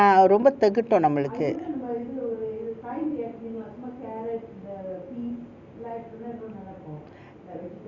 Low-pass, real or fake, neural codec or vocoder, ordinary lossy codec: 7.2 kHz; real; none; Opus, 64 kbps